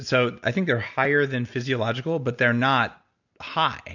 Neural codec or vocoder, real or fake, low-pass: none; real; 7.2 kHz